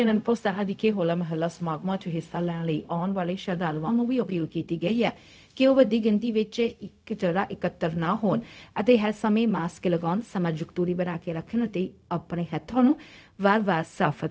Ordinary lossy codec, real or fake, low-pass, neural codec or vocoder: none; fake; none; codec, 16 kHz, 0.4 kbps, LongCat-Audio-Codec